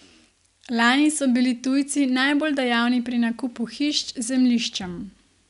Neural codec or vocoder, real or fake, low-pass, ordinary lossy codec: none; real; 10.8 kHz; none